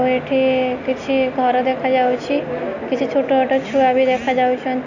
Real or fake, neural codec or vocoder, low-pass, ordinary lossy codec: real; none; 7.2 kHz; none